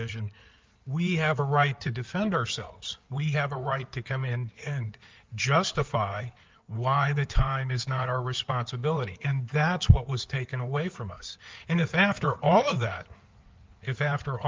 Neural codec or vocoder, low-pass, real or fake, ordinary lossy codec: codec, 16 kHz in and 24 kHz out, 2.2 kbps, FireRedTTS-2 codec; 7.2 kHz; fake; Opus, 24 kbps